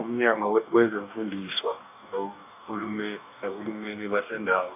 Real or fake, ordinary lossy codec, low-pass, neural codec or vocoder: fake; AAC, 32 kbps; 3.6 kHz; codec, 44.1 kHz, 2.6 kbps, DAC